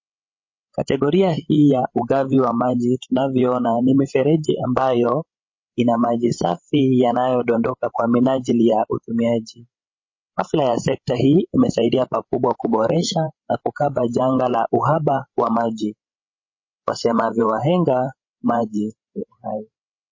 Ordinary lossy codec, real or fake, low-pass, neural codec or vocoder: MP3, 32 kbps; fake; 7.2 kHz; codec, 16 kHz, 16 kbps, FreqCodec, larger model